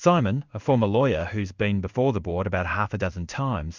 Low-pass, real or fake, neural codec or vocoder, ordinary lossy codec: 7.2 kHz; fake; codec, 16 kHz in and 24 kHz out, 1 kbps, XY-Tokenizer; Opus, 64 kbps